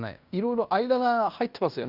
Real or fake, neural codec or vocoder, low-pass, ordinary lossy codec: fake; codec, 16 kHz in and 24 kHz out, 0.9 kbps, LongCat-Audio-Codec, fine tuned four codebook decoder; 5.4 kHz; none